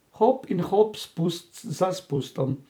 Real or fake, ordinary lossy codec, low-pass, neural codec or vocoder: fake; none; none; vocoder, 44.1 kHz, 128 mel bands every 256 samples, BigVGAN v2